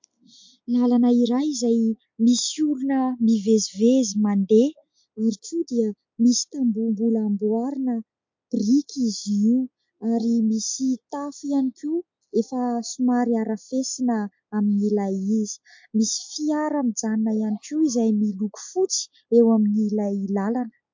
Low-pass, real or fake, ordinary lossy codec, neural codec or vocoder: 7.2 kHz; real; MP3, 48 kbps; none